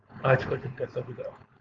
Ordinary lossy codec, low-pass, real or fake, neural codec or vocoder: Opus, 32 kbps; 7.2 kHz; fake; codec, 16 kHz, 4.8 kbps, FACodec